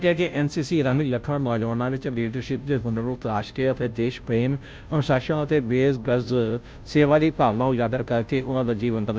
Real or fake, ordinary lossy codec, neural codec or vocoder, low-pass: fake; none; codec, 16 kHz, 0.5 kbps, FunCodec, trained on Chinese and English, 25 frames a second; none